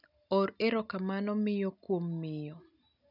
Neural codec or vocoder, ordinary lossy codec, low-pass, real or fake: none; none; 5.4 kHz; real